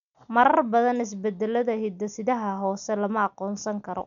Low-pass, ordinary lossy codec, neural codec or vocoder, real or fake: 7.2 kHz; none; none; real